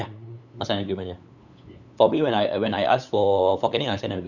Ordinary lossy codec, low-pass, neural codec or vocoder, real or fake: none; 7.2 kHz; codec, 16 kHz, 8 kbps, FunCodec, trained on LibriTTS, 25 frames a second; fake